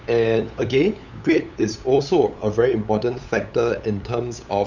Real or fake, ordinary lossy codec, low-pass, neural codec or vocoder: fake; none; 7.2 kHz; codec, 16 kHz, 8 kbps, FunCodec, trained on LibriTTS, 25 frames a second